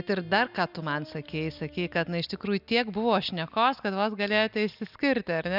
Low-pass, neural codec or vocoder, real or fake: 5.4 kHz; none; real